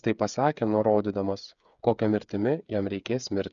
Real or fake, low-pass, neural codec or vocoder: fake; 7.2 kHz; codec, 16 kHz, 8 kbps, FreqCodec, smaller model